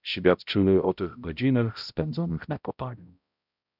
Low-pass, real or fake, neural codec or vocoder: 5.4 kHz; fake; codec, 16 kHz, 0.5 kbps, X-Codec, HuBERT features, trained on balanced general audio